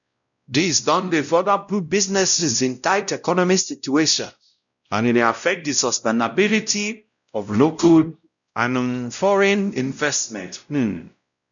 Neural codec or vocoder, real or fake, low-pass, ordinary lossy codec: codec, 16 kHz, 0.5 kbps, X-Codec, WavLM features, trained on Multilingual LibriSpeech; fake; 7.2 kHz; none